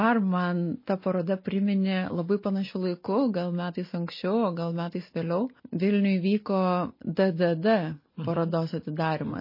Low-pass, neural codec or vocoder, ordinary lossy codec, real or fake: 5.4 kHz; none; MP3, 24 kbps; real